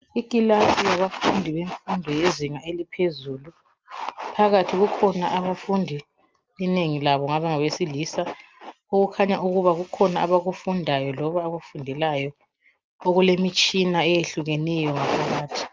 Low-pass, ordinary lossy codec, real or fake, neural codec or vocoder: 7.2 kHz; Opus, 24 kbps; real; none